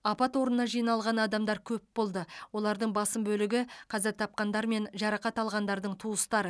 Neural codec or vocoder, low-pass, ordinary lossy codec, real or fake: none; none; none; real